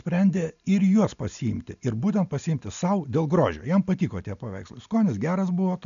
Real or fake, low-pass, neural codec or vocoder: real; 7.2 kHz; none